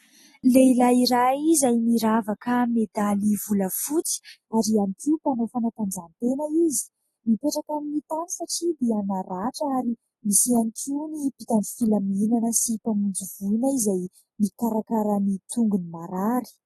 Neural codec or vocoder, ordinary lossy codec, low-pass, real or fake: none; AAC, 32 kbps; 19.8 kHz; real